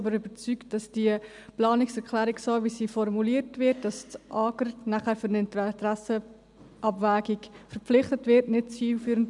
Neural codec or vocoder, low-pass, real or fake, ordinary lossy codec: none; 10.8 kHz; real; none